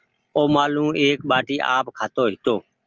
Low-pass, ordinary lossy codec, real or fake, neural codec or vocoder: 7.2 kHz; Opus, 24 kbps; real; none